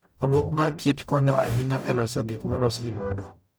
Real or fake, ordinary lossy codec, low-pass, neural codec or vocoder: fake; none; none; codec, 44.1 kHz, 0.9 kbps, DAC